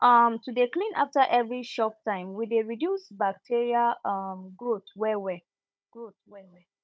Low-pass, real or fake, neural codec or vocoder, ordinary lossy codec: none; fake; codec, 16 kHz, 16 kbps, FunCodec, trained on Chinese and English, 50 frames a second; none